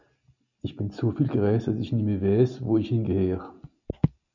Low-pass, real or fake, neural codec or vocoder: 7.2 kHz; real; none